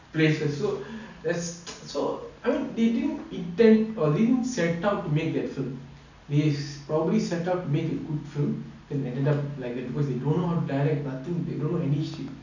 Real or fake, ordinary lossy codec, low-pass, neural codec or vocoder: real; none; 7.2 kHz; none